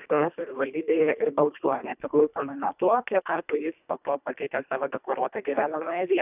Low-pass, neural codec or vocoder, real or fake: 3.6 kHz; codec, 24 kHz, 1.5 kbps, HILCodec; fake